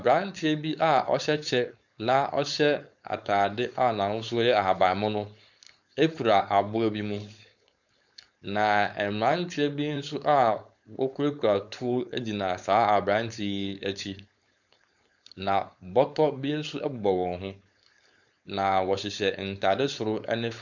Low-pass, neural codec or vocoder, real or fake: 7.2 kHz; codec, 16 kHz, 4.8 kbps, FACodec; fake